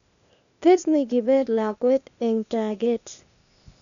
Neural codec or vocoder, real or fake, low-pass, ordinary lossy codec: codec, 16 kHz, 0.8 kbps, ZipCodec; fake; 7.2 kHz; none